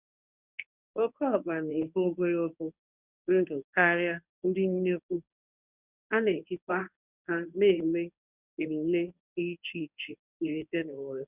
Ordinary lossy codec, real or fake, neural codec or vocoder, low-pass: none; fake; codec, 24 kHz, 0.9 kbps, WavTokenizer, medium speech release version 1; 3.6 kHz